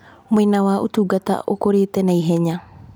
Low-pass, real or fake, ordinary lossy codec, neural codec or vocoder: none; real; none; none